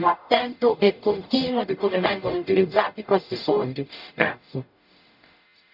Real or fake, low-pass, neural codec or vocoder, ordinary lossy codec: fake; 5.4 kHz; codec, 44.1 kHz, 0.9 kbps, DAC; none